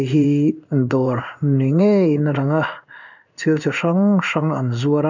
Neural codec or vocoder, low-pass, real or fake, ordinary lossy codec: codec, 16 kHz in and 24 kHz out, 1 kbps, XY-Tokenizer; 7.2 kHz; fake; none